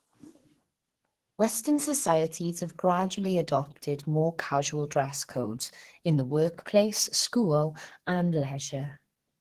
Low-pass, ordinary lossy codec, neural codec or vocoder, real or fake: 14.4 kHz; Opus, 16 kbps; codec, 44.1 kHz, 2.6 kbps, SNAC; fake